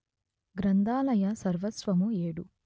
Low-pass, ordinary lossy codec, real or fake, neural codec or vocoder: none; none; real; none